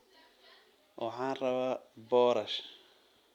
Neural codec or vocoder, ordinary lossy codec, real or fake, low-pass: vocoder, 44.1 kHz, 128 mel bands every 256 samples, BigVGAN v2; none; fake; 19.8 kHz